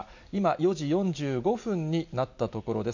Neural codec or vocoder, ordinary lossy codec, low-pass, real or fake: none; none; 7.2 kHz; real